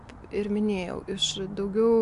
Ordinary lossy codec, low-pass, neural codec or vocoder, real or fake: AAC, 64 kbps; 10.8 kHz; none; real